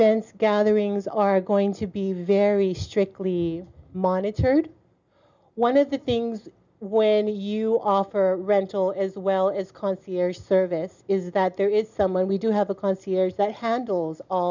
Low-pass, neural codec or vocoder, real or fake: 7.2 kHz; none; real